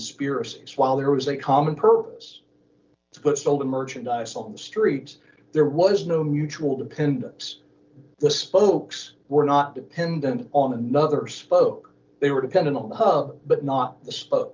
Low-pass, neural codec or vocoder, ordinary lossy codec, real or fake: 7.2 kHz; none; Opus, 24 kbps; real